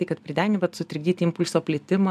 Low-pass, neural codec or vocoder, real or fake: 14.4 kHz; none; real